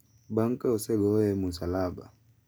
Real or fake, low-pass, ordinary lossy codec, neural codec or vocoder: real; none; none; none